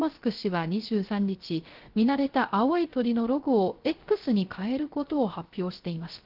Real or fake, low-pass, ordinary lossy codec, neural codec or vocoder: fake; 5.4 kHz; Opus, 16 kbps; codec, 16 kHz, 0.3 kbps, FocalCodec